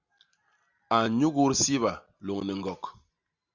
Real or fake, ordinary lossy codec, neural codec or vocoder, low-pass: real; Opus, 64 kbps; none; 7.2 kHz